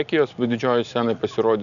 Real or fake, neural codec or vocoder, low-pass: real; none; 7.2 kHz